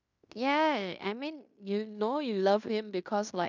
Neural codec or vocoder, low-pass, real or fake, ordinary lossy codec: codec, 16 kHz in and 24 kHz out, 0.9 kbps, LongCat-Audio-Codec, fine tuned four codebook decoder; 7.2 kHz; fake; none